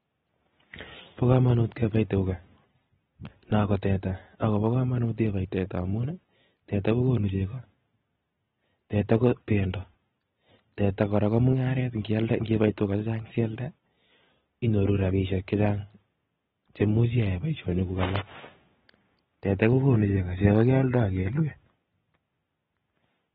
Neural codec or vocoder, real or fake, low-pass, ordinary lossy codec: none; real; 19.8 kHz; AAC, 16 kbps